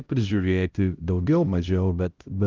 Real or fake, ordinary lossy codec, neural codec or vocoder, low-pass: fake; Opus, 32 kbps; codec, 16 kHz, 0.5 kbps, X-Codec, HuBERT features, trained on LibriSpeech; 7.2 kHz